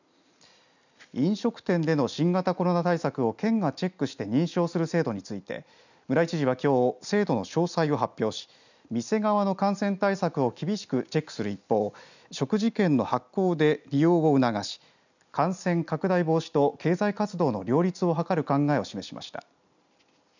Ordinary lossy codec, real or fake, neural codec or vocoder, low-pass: none; real; none; 7.2 kHz